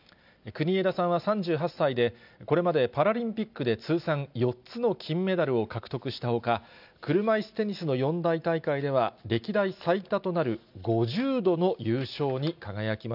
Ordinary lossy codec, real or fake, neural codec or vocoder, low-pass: none; real; none; 5.4 kHz